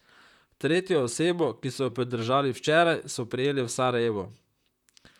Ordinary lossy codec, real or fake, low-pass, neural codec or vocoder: none; fake; 19.8 kHz; vocoder, 44.1 kHz, 128 mel bands, Pupu-Vocoder